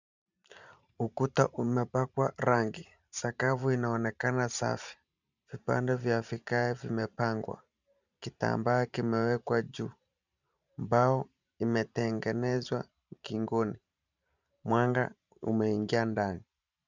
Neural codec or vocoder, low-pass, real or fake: vocoder, 44.1 kHz, 128 mel bands every 512 samples, BigVGAN v2; 7.2 kHz; fake